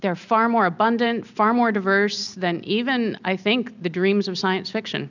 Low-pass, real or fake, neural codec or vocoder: 7.2 kHz; real; none